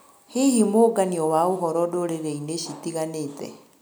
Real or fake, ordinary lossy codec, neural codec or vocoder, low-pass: real; none; none; none